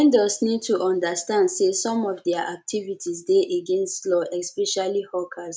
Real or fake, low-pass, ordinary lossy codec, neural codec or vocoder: real; none; none; none